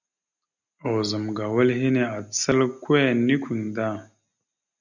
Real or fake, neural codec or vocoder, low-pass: real; none; 7.2 kHz